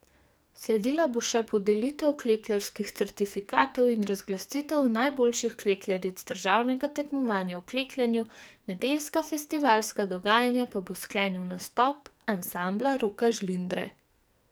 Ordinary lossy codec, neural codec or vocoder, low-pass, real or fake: none; codec, 44.1 kHz, 2.6 kbps, SNAC; none; fake